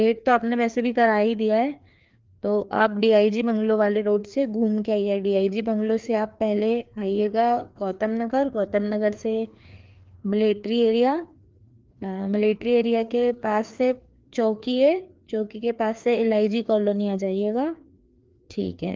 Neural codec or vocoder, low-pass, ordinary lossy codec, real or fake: codec, 16 kHz, 2 kbps, FreqCodec, larger model; 7.2 kHz; Opus, 32 kbps; fake